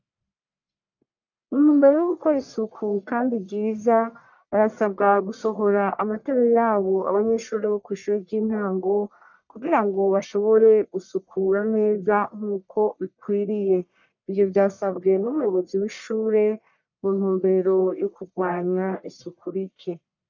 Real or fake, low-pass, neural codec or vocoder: fake; 7.2 kHz; codec, 44.1 kHz, 1.7 kbps, Pupu-Codec